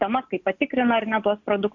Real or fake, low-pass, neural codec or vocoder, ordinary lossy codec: real; 7.2 kHz; none; MP3, 64 kbps